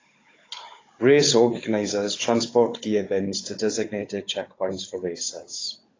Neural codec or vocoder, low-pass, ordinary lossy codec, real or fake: codec, 16 kHz, 16 kbps, FunCodec, trained on Chinese and English, 50 frames a second; 7.2 kHz; AAC, 32 kbps; fake